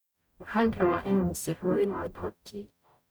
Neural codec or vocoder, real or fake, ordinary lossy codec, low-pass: codec, 44.1 kHz, 0.9 kbps, DAC; fake; none; none